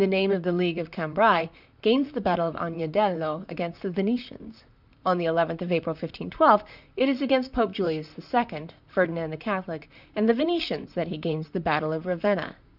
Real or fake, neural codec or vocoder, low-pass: fake; vocoder, 44.1 kHz, 128 mel bands, Pupu-Vocoder; 5.4 kHz